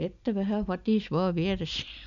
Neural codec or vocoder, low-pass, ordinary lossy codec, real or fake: none; 7.2 kHz; none; real